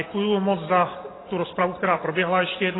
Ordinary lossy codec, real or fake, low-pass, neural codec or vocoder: AAC, 16 kbps; real; 7.2 kHz; none